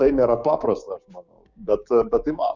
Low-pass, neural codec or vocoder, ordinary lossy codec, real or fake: 7.2 kHz; codec, 16 kHz, 6 kbps, DAC; Opus, 64 kbps; fake